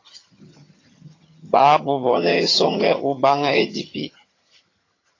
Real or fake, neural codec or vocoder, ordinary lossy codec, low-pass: fake; vocoder, 22.05 kHz, 80 mel bands, HiFi-GAN; AAC, 32 kbps; 7.2 kHz